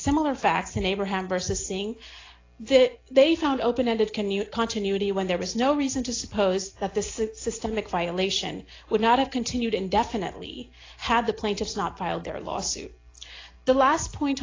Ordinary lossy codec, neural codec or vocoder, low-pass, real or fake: AAC, 32 kbps; none; 7.2 kHz; real